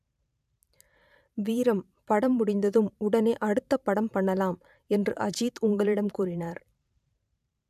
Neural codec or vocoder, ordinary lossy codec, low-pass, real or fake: vocoder, 44.1 kHz, 128 mel bands every 512 samples, BigVGAN v2; none; 14.4 kHz; fake